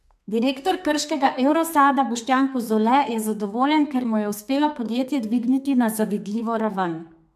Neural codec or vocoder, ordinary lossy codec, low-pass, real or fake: codec, 32 kHz, 1.9 kbps, SNAC; MP3, 96 kbps; 14.4 kHz; fake